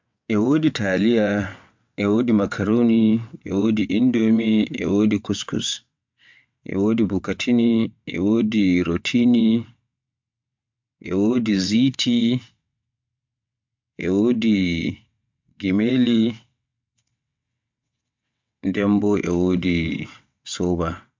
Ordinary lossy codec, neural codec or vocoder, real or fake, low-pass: MP3, 64 kbps; vocoder, 22.05 kHz, 80 mel bands, WaveNeXt; fake; 7.2 kHz